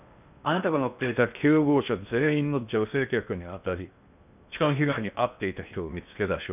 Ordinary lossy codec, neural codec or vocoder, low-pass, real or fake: none; codec, 16 kHz in and 24 kHz out, 0.6 kbps, FocalCodec, streaming, 4096 codes; 3.6 kHz; fake